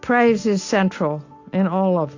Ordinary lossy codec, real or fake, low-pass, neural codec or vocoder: AAC, 48 kbps; real; 7.2 kHz; none